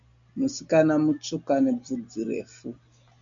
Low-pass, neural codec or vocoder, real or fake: 7.2 kHz; none; real